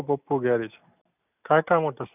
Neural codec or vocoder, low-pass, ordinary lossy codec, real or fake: none; 3.6 kHz; none; real